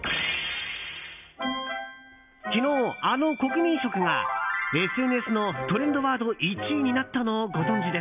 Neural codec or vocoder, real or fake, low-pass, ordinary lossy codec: none; real; 3.6 kHz; none